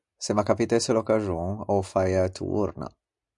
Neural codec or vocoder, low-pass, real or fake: none; 10.8 kHz; real